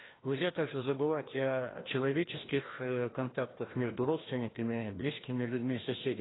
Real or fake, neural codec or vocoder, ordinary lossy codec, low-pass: fake; codec, 16 kHz, 1 kbps, FreqCodec, larger model; AAC, 16 kbps; 7.2 kHz